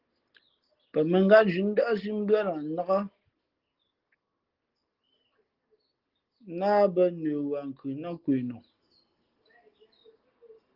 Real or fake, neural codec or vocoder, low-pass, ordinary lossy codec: real; none; 5.4 kHz; Opus, 16 kbps